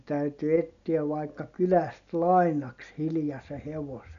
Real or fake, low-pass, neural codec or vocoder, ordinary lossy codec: real; 7.2 kHz; none; none